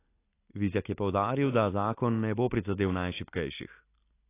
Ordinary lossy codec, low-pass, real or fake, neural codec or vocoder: AAC, 24 kbps; 3.6 kHz; real; none